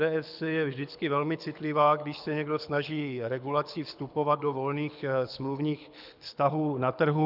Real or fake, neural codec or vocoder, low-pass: fake; codec, 24 kHz, 6 kbps, HILCodec; 5.4 kHz